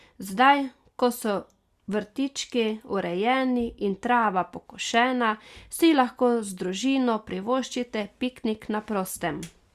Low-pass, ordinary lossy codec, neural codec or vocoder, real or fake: 14.4 kHz; Opus, 64 kbps; none; real